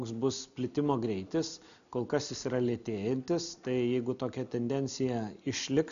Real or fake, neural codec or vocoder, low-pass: real; none; 7.2 kHz